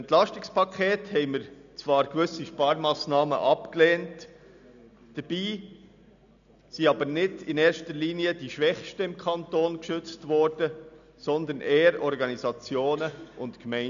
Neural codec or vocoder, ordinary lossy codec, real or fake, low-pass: none; none; real; 7.2 kHz